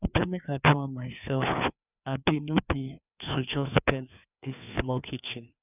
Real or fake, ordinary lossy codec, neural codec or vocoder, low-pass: fake; none; codec, 16 kHz, 2 kbps, FreqCodec, larger model; 3.6 kHz